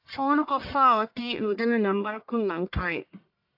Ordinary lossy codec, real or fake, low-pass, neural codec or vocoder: AAC, 48 kbps; fake; 5.4 kHz; codec, 44.1 kHz, 1.7 kbps, Pupu-Codec